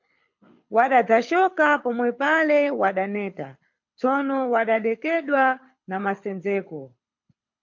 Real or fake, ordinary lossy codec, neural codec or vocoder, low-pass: fake; MP3, 48 kbps; codec, 24 kHz, 6 kbps, HILCodec; 7.2 kHz